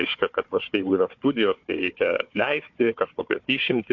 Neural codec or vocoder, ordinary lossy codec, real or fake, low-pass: codec, 16 kHz, 4 kbps, FunCodec, trained on Chinese and English, 50 frames a second; MP3, 48 kbps; fake; 7.2 kHz